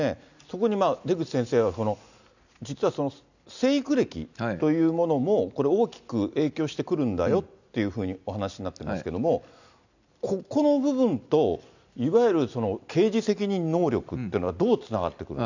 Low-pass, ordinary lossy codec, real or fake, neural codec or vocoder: 7.2 kHz; none; real; none